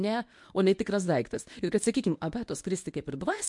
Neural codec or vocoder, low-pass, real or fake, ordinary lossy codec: codec, 24 kHz, 0.9 kbps, WavTokenizer, medium speech release version 1; 10.8 kHz; fake; MP3, 64 kbps